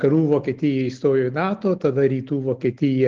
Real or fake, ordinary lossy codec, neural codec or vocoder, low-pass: real; Opus, 16 kbps; none; 7.2 kHz